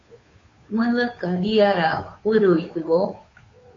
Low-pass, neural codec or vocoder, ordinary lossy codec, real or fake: 7.2 kHz; codec, 16 kHz, 2 kbps, FunCodec, trained on Chinese and English, 25 frames a second; AAC, 32 kbps; fake